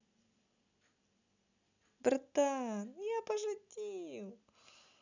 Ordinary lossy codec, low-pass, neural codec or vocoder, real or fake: none; 7.2 kHz; none; real